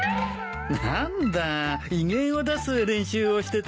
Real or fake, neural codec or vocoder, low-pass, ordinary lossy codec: real; none; none; none